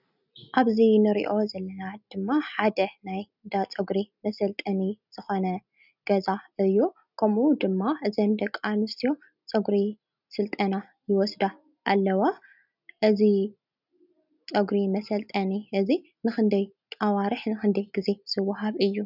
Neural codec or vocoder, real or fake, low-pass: none; real; 5.4 kHz